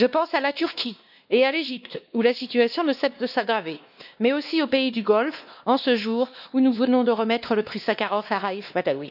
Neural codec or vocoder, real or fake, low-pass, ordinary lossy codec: codec, 16 kHz, 2 kbps, X-Codec, WavLM features, trained on Multilingual LibriSpeech; fake; 5.4 kHz; none